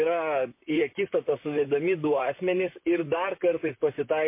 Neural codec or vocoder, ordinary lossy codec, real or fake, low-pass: vocoder, 44.1 kHz, 128 mel bands, Pupu-Vocoder; MP3, 24 kbps; fake; 3.6 kHz